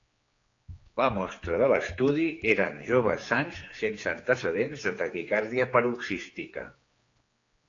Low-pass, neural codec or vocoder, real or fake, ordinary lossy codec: 7.2 kHz; codec, 16 kHz, 4 kbps, X-Codec, HuBERT features, trained on general audio; fake; AAC, 32 kbps